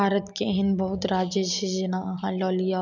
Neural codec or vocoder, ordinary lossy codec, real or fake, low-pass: none; none; real; 7.2 kHz